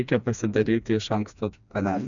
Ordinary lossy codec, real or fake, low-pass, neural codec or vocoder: MP3, 96 kbps; fake; 7.2 kHz; codec, 16 kHz, 2 kbps, FreqCodec, smaller model